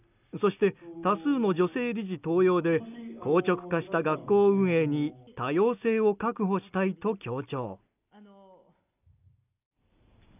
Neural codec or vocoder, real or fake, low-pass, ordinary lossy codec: none; real; 3.6 kHz; none